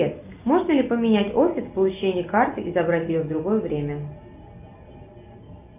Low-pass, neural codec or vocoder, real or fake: 3.6 kHz; none; real